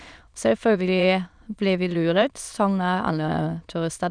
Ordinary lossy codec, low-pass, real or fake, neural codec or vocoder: none; 9.9 kHz; fake; autoencoder, 22.05 kHz, a latent of 192 numbers a frame, VITS, trained on many speakers